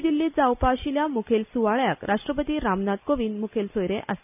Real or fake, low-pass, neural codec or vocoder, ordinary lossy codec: real; 3.6 kHz; none; none